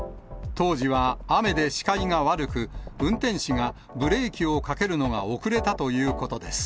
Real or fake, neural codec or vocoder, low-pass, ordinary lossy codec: real; none; none; none